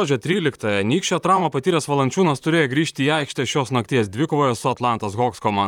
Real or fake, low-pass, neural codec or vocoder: fake; 19.8 kHz; vocoder, 44.1 kHz, 128 mel bands, Pupu-Vocoder